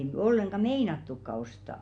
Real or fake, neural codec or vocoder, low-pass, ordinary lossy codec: real; none; 9.9 kHz; none